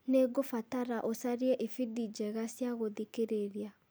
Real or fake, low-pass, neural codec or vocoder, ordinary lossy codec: real; none; none; none